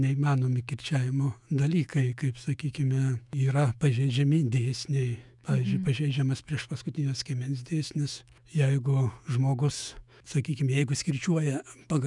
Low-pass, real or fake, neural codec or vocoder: 10.8 kHz; fake; autoencoder, 48 kHz, 128 numbers a frame, DAC-VAE, trained on Japanese speech